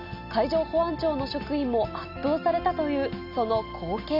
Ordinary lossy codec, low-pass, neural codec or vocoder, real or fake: none; 5.4 kHz; none; real